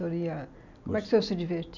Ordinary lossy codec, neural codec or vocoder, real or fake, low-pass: none; none; real; 7.2 kHz